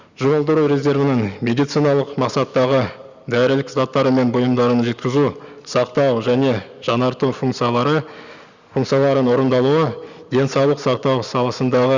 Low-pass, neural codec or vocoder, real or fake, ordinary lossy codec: 7.2 kHz; none; real; Opus, 64 kbps